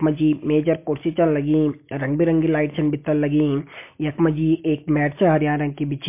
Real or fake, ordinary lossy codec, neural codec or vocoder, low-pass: real; MP3, 24 kbps; none; 3.6 kHz